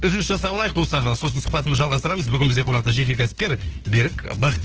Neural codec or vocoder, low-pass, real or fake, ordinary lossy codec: codec, 16 kHz, 2 kbps, FunCodec, trained on LibriTTS, 25 frames a second; 7.2 kHz; fake; Opus, 16 kbps